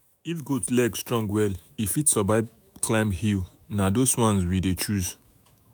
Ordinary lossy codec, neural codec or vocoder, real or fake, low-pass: none; autoencoder, 48 kHz, 128 numbers a frame, DAC-VAE, trained on Japanese speech; fake; none